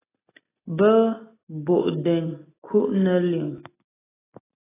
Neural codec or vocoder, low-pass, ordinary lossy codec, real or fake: none; 3.6 kHz; AAC, 16 kbps; real